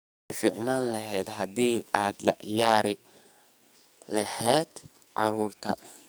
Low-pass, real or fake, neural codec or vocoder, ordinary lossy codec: none; fake; codec, 44.1 kHz, 2.6 kbps, SNAC; none